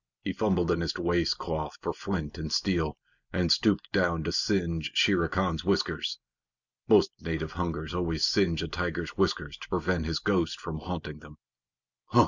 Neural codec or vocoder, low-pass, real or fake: none; 7.2 kHz; real